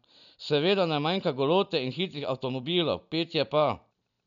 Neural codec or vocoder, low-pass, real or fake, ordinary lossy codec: none; 7.2 kHz; real; none